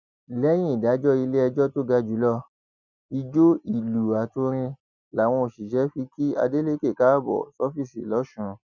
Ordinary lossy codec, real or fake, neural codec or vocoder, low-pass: none; real; none; 7.2 kHz